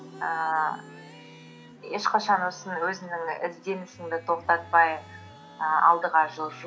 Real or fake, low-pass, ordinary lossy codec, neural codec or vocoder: real; none; none; none